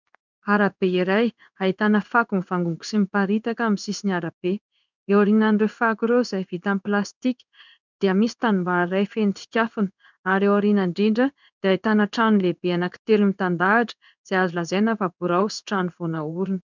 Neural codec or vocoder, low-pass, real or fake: codec, 16 kHz in and 24 kHz out, 1 kbps, XY-Tokenizer; 7.2 kHz; fake